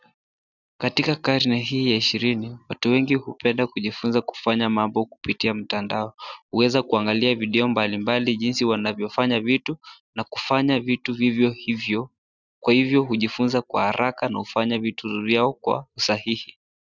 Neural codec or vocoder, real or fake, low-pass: none; real; 7.2 kHz